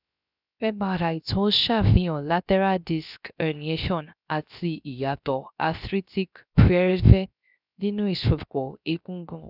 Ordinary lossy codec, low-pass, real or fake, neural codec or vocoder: none; 5.4 kHz; fake; codec, 16 kHz, 0.3 kbps, FocalCodec